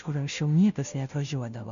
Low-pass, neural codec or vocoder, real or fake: 7.2 kHz; codec, 16 kHz, 0.5 kbps, FunCodec, trained on Chinese and English, 25 frames a second; fake